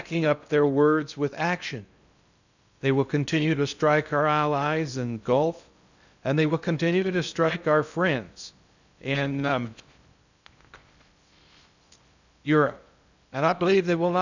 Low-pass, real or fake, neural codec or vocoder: 7.2 kHz; fake; codec, 16 kHz in and 24 kHz out, 0.6 kbps, FocalCodec, streaming, 2048 codes